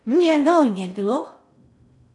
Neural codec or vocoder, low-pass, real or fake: codec, 16 kHz in and 24 kHz out, 0.6 kbps, FocalCodec, streaming, 4096 codes; 10.8 kHz; fake